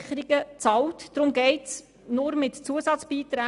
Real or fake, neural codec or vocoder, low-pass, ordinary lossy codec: real; none; 10.8 kHz; Opus, 64 kbps